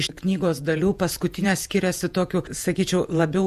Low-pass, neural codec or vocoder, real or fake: 14.4 kHz; vocoder, 48 kHz, 128 mel bands, Vocos; fake